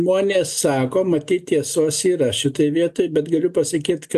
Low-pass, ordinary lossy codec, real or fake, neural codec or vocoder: 14.4 kHz; AAC, 96 kbps; real; none